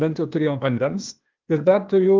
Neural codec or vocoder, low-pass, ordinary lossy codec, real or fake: codec, 16 kHz, 0.8 kbps, ZipCodec; 7.2 kHz; Opus, 32 kbps; fake